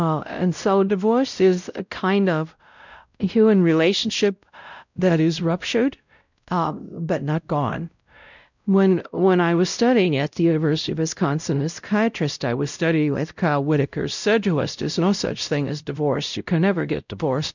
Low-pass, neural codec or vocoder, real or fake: 7.2 kHz; codec, 16 kHz, 0.5 kbps, X-Codec, WavLM features, trained on Multilingual LibriSpeech; fake